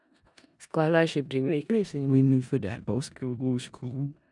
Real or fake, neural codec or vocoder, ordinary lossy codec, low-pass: fake; codec, 16 kHz in and 24 kHz out, 0.4 kbps, LongCat-Audio-Codec, four codebook decoder; none; 10.8 kHz